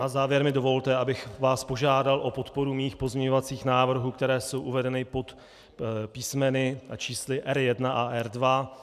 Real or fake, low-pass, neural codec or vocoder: real; 14.4 kHz; none